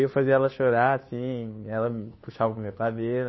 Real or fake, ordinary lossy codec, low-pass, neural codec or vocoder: fake; MP3, 24 kbps; 7.2 kHz; codec, 16 kHz, 2 kbps, FunCodec, trained on Chinese and English, 25 frames a second